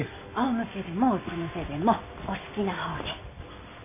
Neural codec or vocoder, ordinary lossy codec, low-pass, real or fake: none; none; 3.6 kHz; real